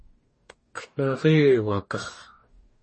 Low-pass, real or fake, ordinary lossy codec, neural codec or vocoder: 10.8 kHz; fake; MP3, 32 kbps; codec, 44.1 kHz, 2.6 kbps, DAC